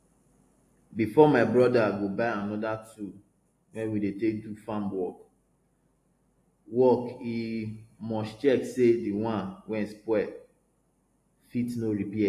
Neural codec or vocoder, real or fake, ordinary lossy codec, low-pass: none; real; AAC, 48 kbps; 14.4 kHz